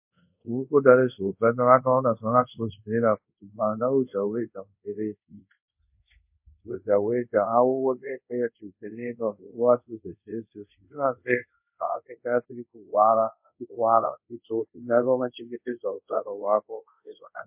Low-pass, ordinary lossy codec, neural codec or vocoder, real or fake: 3.6 kHz; MP3, 32 kbps; codec, 24 kHz, 0.5 kbps, DualCodec; fake